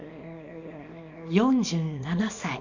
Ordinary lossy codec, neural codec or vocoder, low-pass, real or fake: none; codec, 24 kHz, 0.9 kbps, WavTokenizer, small release; 7.2 kHz; fake